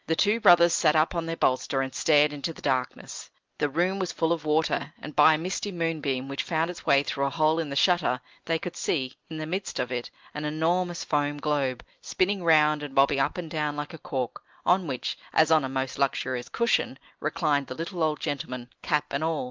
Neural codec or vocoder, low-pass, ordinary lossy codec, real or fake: none; 7.2 kHz; Opus, 24 kbps; real